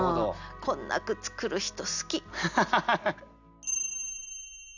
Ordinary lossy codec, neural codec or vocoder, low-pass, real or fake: none; none; 7.2 kHz; real